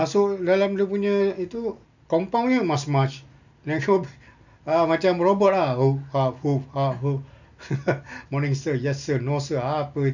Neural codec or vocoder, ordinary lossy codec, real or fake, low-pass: none; none; real; 7.2 kHz